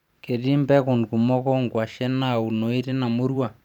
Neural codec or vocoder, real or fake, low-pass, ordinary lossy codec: vocoder, 44.1 kHz, 128 mel bands every 512 samples, BigVGAN v2; fake; 19.8 kHz; none